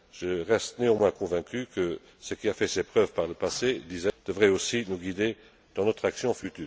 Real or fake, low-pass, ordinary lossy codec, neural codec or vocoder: real; none; none; none